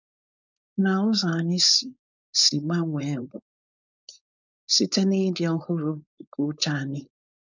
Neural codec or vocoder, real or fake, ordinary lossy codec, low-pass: codec, 16 kHz, 4.8 kbps, FACodec; fake; none; 7.2 kHz